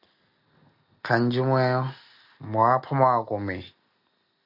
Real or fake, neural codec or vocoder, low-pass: real; none; 5.4 kHz